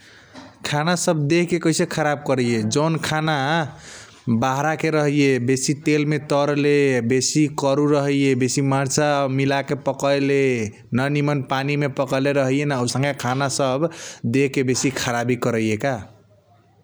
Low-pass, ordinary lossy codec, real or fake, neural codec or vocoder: none; none; real; none